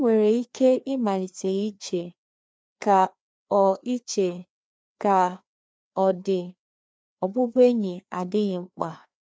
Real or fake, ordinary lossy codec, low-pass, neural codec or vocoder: fake; none; none; codec, 16 kHz, 2 kbps, FreqCodec, larger model